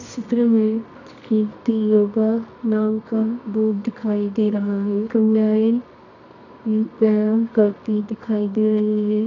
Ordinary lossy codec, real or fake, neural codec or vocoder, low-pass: none; fake; codec, 24 kHz, 0.9 kbps, WavTokenizer, medium music audio release; 7.2 kHz